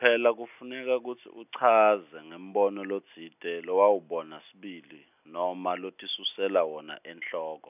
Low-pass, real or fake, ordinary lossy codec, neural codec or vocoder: 3.6 kHz; real; none; none